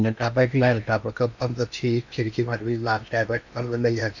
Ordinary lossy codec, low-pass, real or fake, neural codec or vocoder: none; 7.2 kHz; fake; codec, 16 kHz in and 24 kHz out, 0.8 kbps, FocalCodec, streaming, 65536 codes